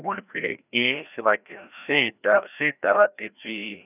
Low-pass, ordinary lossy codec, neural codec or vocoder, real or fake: 3.6 kHz; none; codec, 16 kHz, 1 kbps, FreqCodec, larger model; fake